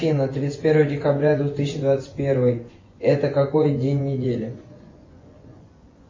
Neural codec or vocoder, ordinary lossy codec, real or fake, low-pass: vocoder, 24 kHz, 100 mel bands, Vocos; MP3, 32 kbps; fake; 7.2 kHz